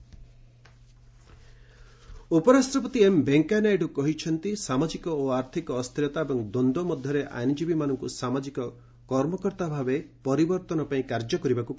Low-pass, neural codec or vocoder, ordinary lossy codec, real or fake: none; none; none; real